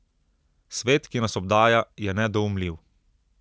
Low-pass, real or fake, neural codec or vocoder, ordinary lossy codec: none; real; none; none